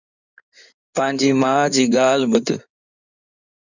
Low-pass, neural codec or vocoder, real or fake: 7.2 kHz; codec, 16 kHz in and 24 kHz out, 2.2 kbps, FireRedTTS-2 codec; fake